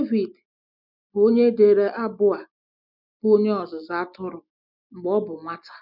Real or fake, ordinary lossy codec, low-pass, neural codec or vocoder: real; none; 5.4 kHz; none